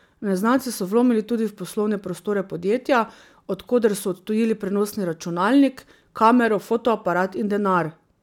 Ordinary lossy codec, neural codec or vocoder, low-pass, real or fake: none; none; 19.8 kHz; real